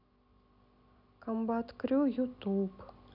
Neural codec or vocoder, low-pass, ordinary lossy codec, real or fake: none; 5.4 kHz; none; real